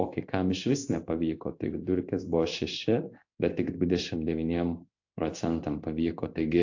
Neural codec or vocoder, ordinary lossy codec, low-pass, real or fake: codec, 16 kHz in and 24 kHz out, 1 kbps, XY-Tokenizer; AAC, 48 kbps; 7.2 kHz; fake